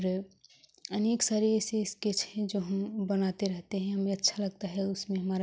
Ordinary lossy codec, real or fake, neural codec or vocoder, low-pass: none; real; none; none